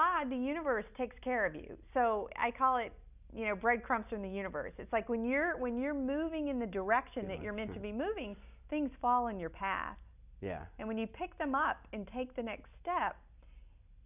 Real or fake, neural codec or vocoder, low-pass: real; none; 3.6 kHz